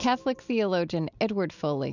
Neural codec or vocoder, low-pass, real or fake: none; 7.2 kHz; real